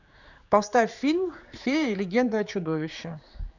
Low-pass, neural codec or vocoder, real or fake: 7.2 kHz; codec, 16 kHz, 4 kbps, X-Codec, HuBERT features, trained on general audio; fake